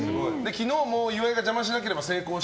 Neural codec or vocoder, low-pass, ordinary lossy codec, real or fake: none; none; none; real